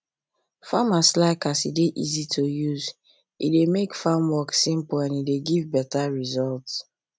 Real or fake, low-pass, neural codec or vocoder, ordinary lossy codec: real; none; none; none